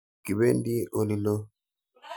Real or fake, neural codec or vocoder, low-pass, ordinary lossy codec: real; none; none; none